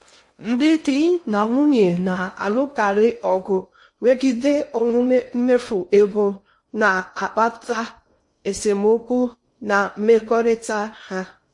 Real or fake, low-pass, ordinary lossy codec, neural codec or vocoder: fake; 10.8 kHz; MP3, 48 kbps; codec, 16 kHz in and 24 kHz out, 0.6 kbps, FocalCodec, streaming, 2048 codes